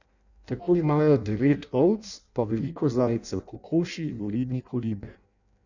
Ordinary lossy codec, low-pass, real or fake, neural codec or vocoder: none; 7.2 kHz; fake; codec, 16 kHz in and 24 kHz out, 0.6 kbps, FireRedTTS-2 codec